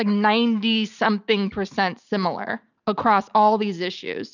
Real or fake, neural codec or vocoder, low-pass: real; none; 7.2 kHz